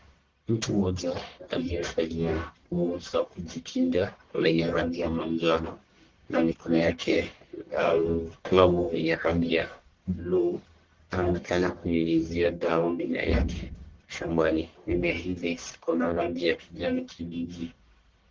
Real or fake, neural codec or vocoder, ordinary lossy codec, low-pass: fake; codec, 44.1 kHz, 1.7 kbps, Pupu-Codec; Opus, 16 kbps; 7.2 kHz